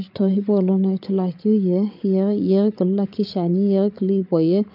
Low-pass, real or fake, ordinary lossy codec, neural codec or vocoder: 5.4 kHz; fake; MP3, 48 kbps; codec, 16 kHz, 8 kbps, FreqCodec, larger model